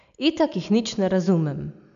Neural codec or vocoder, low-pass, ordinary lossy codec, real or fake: none; 7.2 kHz; none; real